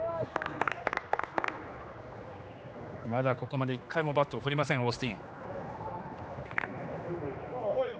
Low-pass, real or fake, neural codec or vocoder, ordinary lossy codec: none; fake; codec, 16 kHz, 2 kbps, X-Codec, HuBERT features, trained on general audio; none